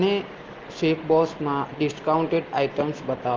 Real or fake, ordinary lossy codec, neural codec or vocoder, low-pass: real; Opus, 16 kbps; none; 7.2 kHz